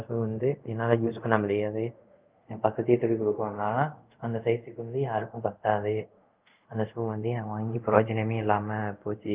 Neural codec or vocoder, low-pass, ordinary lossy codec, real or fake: codec, 24 kHz, 0.5 kbps, DualCodec; 3.6 kHz; Opus, 32 kbps; fake